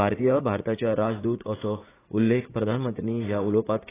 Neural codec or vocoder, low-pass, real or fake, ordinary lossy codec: vocoder, 44.1 kHz, 80 mel bands, Vocos; 3.6 kHz; fake; AAC, 16 kbps